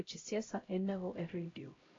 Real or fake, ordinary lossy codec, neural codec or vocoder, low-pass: fake; AAC, 32 kbps; codec, 16 kHz, 0.5 kbps, X-Codec, HuBERT features, trained on LibriSpeech; 7.2 kHz